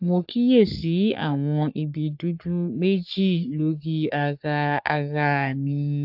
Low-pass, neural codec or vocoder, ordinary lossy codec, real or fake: 5.4 kHz; codec, 44.1 kHz, 3.4 kbps, Pupu-Codec; AAC, 48 kbps; fake